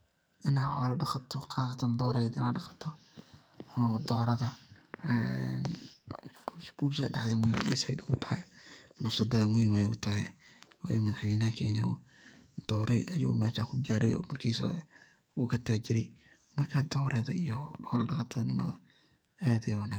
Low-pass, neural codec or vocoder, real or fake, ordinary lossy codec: none; codec, 44.1 kHz, 2.6 kbps, SNAC; fake; none